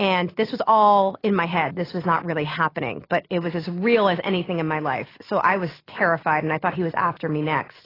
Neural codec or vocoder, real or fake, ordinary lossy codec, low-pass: none; real; AAC, 24 kbps; 5.4 kHz